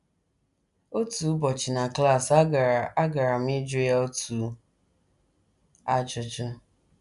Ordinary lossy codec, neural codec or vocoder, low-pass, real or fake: none; none; 10.8 kHz; real